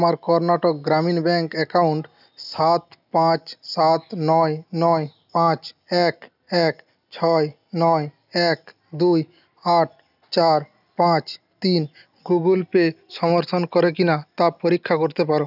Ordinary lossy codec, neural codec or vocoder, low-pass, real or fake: none; none; 5.4 kHz; real